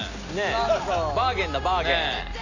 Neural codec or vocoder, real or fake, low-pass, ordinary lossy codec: none; real; 7.2 kHz; none